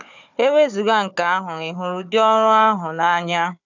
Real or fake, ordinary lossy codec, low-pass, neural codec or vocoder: fake; none; 7.2 kHz; codec, 16 kHz, 4 kbps, FunCodec, trained on Chinese and English, 50 frames a second